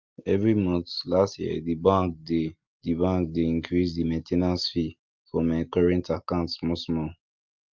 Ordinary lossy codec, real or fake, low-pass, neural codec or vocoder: Opus, 24 kbps; real; 7.2 kHz; none